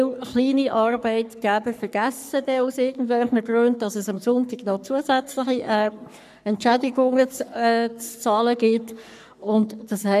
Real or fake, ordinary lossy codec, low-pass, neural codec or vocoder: fake; none; 14.4 kHz; codec, 44.1 kHz, 3.4 kbps, Pupu-Codec